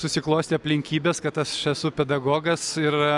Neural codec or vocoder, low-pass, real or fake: none; 10.8 kHz; real